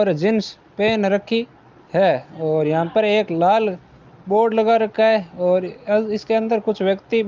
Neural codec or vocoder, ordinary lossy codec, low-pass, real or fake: none; Opus, 24 kbps; 7.2 kHz; real